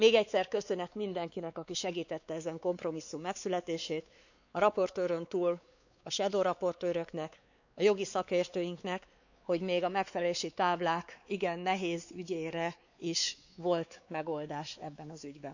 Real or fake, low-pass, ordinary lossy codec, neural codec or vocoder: fake; 7.2 kHz; none; codec, 16 kHz, 4 kbps, X-Codec, WavLM features, trained on Multilingual LibriSpeech